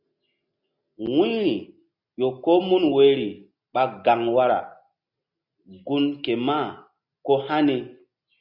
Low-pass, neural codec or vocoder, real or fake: 5.4 kHz; none; real